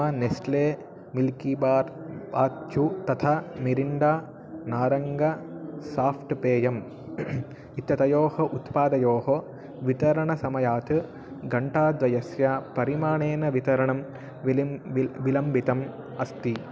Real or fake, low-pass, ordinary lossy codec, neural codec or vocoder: real; none; none; none